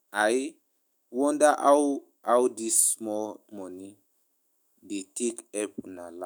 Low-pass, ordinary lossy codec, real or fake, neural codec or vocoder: none; none; fake; autoencoder, 48 kHz, 128 numbers a frame, DAC-VAE, trained on Japanese speech